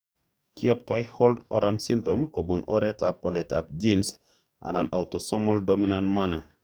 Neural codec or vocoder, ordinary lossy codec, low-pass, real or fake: codec, 44.1 kHz, 2.6 kbps, DAC; none; none; fake